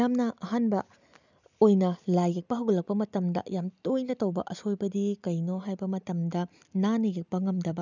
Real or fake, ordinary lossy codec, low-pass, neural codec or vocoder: real; none; 7.2 kHz; none